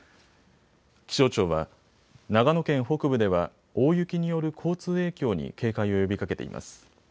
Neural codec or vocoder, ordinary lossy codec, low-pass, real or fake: none; none; none; real